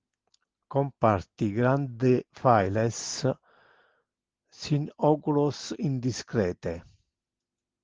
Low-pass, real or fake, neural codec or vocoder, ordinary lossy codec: 7.2 kHz; real; none; Opus, 16 kbps